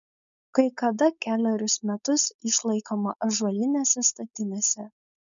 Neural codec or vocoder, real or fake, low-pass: codec, 16 kHz, 4.8 kbps, FACodec; fake; 7.2 kHz